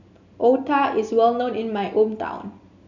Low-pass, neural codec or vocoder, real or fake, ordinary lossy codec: 7.2 kHz; none; real; none